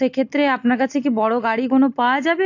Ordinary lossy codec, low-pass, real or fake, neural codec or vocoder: AAC, 48 kbps; 7.2 kHz; real; none